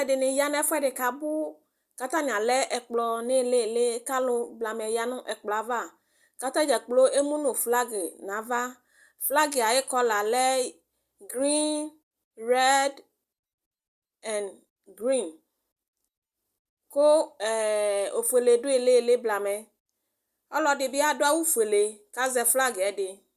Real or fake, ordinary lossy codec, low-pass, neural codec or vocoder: real; Opus, 64 kbps; 14.4 kHz; none